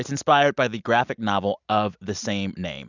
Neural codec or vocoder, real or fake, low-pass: none; real; 7.2 kHz